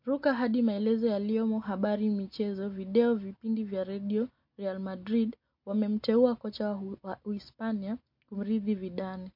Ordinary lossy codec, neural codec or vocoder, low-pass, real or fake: MP3, 32 kbps; none; 5.4 kHz; real